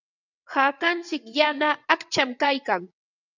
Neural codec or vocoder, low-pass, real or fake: vocoder, 22.05 kHz, 80 mel bands, WaveNeXt; 7.2 kHz; fake